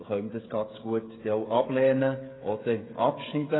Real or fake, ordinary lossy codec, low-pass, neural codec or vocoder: fake; AAC, 16 kbps; 7.2 kHz; codec, 16 kHz, 16 kbps, FreqCodec, smaller model